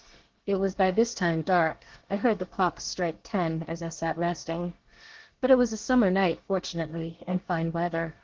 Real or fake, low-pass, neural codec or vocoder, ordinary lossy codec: fake; 7.2 kHz; codec, 24 kHz, 1 kbps, SNAC; Opus, 16 kbps